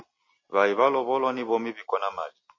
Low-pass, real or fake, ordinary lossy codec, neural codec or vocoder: 7.2 kHz; real; MP3, 32 kbps; none